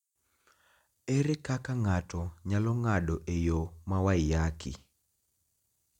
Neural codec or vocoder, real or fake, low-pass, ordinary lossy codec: none; real; 19.8 kHz; none